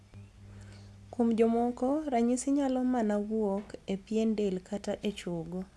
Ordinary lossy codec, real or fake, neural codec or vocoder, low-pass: none; real; none; none